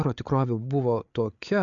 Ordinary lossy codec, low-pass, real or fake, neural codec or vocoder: MP3, 96 kbps; 7.2 kHz; fake; codec, 16 kHz, 8 kbps, FreqCodec, larger model